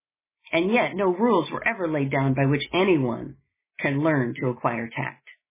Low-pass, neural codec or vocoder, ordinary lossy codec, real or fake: 3.6 kHz; none; MP3, 16 kbps; real